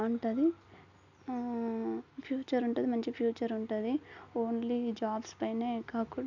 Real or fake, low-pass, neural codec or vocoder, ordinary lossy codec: real; 7.2 kHz; none; none